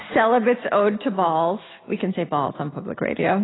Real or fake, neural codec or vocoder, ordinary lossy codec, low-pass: real; none; AAC, 16 kbps; 7.2 kHz